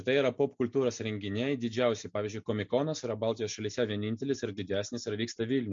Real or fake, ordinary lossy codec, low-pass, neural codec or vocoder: real; MP3, 48 kbps; 7.2 kHz; none